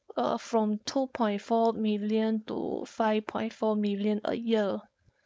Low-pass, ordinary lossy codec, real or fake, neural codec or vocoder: none; none; fake; codec, 16 kHz, 4.8 kbps, FACodec